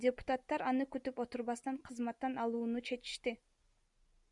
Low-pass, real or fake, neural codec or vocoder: 10.8 kHz; real; none